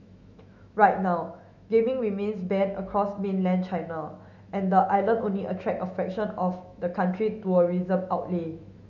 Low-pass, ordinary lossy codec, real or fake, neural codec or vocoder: 7.2 kHz; none; fake; autoencoder, 48 kHz, 128 numbers a frame, DAC-VAE, trained on Japanese speech